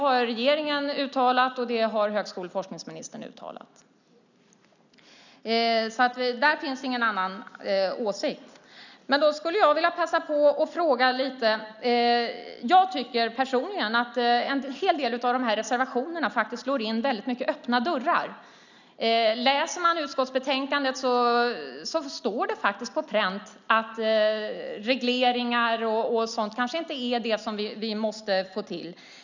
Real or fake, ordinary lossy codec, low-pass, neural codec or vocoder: real; none; 7.2 kHz; none